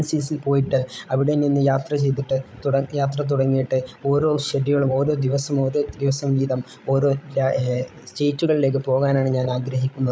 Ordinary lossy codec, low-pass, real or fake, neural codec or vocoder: none; none; fake; codec, 16 kHz, 16 kbps, FreqCodec, larger model